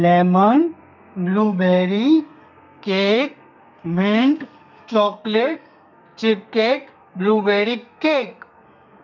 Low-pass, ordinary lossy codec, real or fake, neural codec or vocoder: 7.2 kHz; none; fake; codec, 32 kHz, 1.9 kbps, SNAC